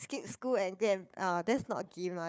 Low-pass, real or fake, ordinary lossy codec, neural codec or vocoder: none; fake; none; codec, 16 kHz, 4 kbps, FunCodec, trained on Chinese and English, 50 frames a second